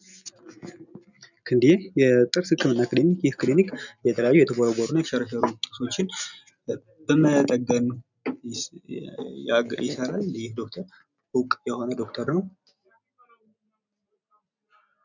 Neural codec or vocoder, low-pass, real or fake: none; 7.2 kHz; real